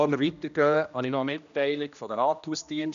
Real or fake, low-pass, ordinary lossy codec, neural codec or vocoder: fake; 7.2 kHz; none; codec, 16 kHz, 1 kbps, X-Codec, HuBERT features, trained on general audio